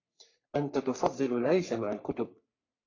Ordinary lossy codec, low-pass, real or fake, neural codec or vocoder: AAC, 32 kbps; 7.2 kHz; fake; codec, 44.1 kHz, 3.4 kbps, Pupu-Codec